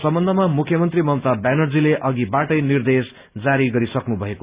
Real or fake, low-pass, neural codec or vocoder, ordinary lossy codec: real; 3.6 kHz; none; Opus, 64 kbps